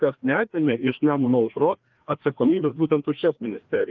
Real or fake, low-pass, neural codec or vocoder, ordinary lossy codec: fake; 7.2 kHz; codec, 16 kHz, 2 kbps, FreqCodec, larger model; Opus, 32 kbps